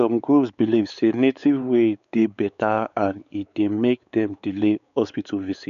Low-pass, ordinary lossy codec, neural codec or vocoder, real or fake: 7.2 kHz; none; codec, 16 kHz, 4 kbps, X-Codec, WavLM features, trained on Multilingual LibriSpeech; fake